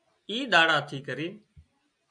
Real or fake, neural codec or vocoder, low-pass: real; none; 9.9 kHz